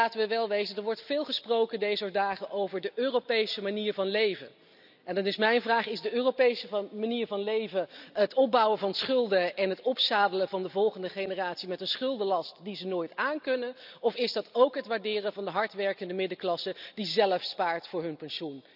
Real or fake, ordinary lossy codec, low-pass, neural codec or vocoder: real; none; 5.4 kHz; none